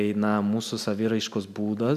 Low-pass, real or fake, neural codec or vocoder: 14.4 kHz; real; none